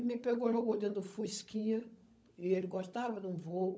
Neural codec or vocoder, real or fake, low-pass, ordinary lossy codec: codec, 16 kHz, 16 kbps, FunCodec, trained on LibriTTS, 50 frames a second; fake; none; none